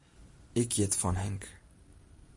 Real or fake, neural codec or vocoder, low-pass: real; none; 10.8 kHz